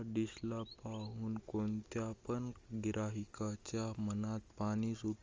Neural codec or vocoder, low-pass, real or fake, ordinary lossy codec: none; 7.2 kHz; real; Opus, 32 kbps